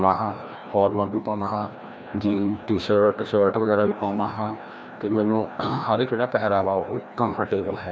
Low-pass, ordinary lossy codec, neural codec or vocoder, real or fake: none; none; codec, 16 kHz, 1 kbps, FreqCodec, larger model; fake